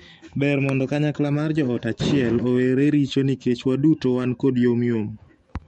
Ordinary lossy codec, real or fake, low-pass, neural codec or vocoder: MP3, 48 kbps; fake; 19.8 kHz; autoencoder, 48 kHz, 128 numbers a frame, DAC-VAE, trained on Japanese speech